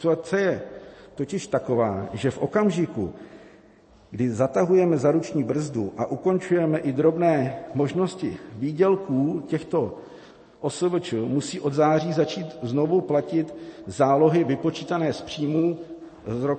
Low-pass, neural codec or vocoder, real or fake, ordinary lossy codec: 9.9 kHz; none; real; MP3, 32 kbps